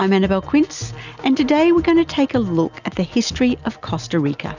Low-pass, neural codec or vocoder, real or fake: 7.2 kHz; none; real